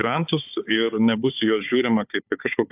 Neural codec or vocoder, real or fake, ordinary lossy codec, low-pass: codec, 16 kHz, 4 kbps, FunCodec, trained on Chinese and English, 50 frames a second; fake; AAC, 32 kbps; 3.6 kHz